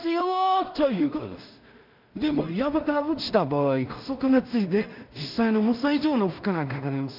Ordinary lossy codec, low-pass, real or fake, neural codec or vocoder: none; 5.4 kHz; fake; codec, 16 kHz in and 24 kHz out, 0.4 kbps, LongCat-Audio-Codec, two codebook decoder